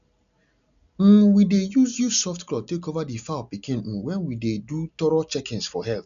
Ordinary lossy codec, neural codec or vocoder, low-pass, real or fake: none; none; 7.2 kHz; real